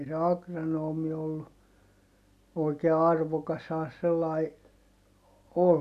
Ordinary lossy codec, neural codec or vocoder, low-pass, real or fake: none; none; 14.4 kHz; real